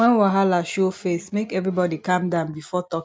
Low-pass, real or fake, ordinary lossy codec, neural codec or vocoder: none; real; none; none